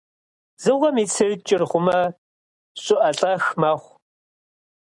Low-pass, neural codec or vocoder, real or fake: 10.8 kHz; none; real